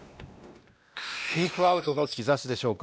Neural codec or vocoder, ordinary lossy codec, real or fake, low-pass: codec, 16 kHz, 1 kbps, X-Codec, WavLM features, trained on Multilingual LibriSpeech; none; fake; none